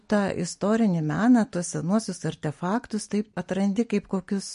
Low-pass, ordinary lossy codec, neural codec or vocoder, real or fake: 10.8 kHz; MP3, 48 kbps; none; real